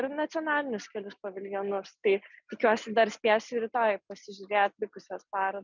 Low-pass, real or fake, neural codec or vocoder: 7.2 kHz; real; none